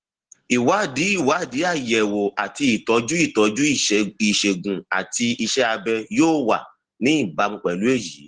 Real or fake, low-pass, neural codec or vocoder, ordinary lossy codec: real; 9.9 kHz; none; Opus, 16 kbps